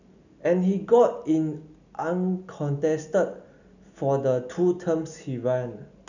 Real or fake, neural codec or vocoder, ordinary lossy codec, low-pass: real; none; none; 7.2 kHz